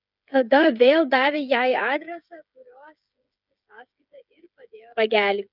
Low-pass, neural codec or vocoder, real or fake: 5.4 kHz; codec, 16 kHz, 8 kbps, FreqCodec, smaller model; fake